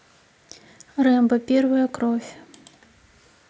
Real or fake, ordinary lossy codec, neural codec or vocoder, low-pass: real; none; none; none